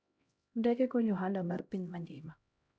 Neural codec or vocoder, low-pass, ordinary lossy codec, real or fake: codec, 16 kHz, 0.5 kbps, X-Codec, HuBERT features, trained on LibriSpeech; none; none; fake